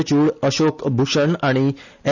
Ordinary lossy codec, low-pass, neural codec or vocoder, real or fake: none; 7.2 kHz; none; real